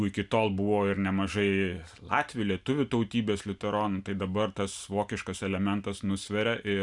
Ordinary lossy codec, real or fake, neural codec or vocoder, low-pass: MP3, 96 kbps; real; none; 10.8 kHz